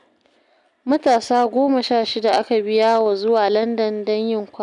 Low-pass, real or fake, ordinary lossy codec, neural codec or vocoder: 10.8 kHz; real; none; none